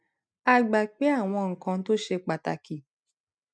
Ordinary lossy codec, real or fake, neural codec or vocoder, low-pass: none; real; none; none